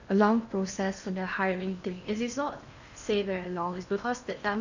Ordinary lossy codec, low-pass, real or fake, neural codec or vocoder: none; 7.2 kHz; fake; codec, 16 kHz in and 24 kHz out, 0.8 kbps, FocalCodec, streaming, 65536 codes